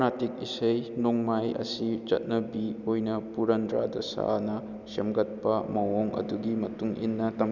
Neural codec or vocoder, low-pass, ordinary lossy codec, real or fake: none; 7.2 kHz; none; real